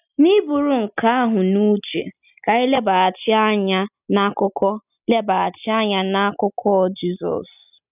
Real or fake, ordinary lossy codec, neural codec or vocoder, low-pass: real; none; none; 3.6 kHz